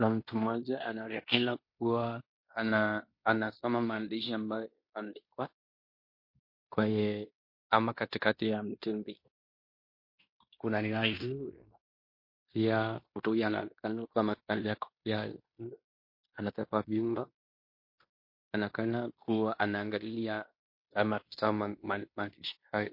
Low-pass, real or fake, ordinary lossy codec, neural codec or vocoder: 5.4 kHz; fake; MP3, 32 kbps; codec, 16 kHz in and 24 kHz out, 0.9 kbps, LongCat-Audio-Codec, fine tuned four codebook decoder